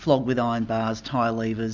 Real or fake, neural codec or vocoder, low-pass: real; none; 7.2 kHz